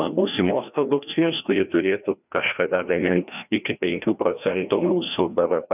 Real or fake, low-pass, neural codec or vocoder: fake; 3.6 kHz; codec, 16 kHz, 1 kbps, FreqCodec, larger model